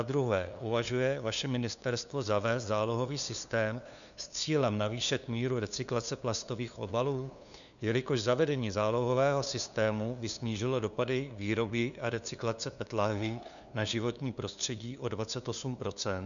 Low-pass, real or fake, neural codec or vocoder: 7.2 kHz; fake; codec, 16 kHz, 2 kbps, FunCodec, trained on LibriTTS, 25 frames a second